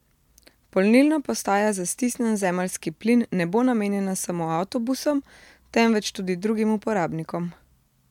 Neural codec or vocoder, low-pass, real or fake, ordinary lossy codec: none; 19.8 kHz; real; MP3, 96 kbps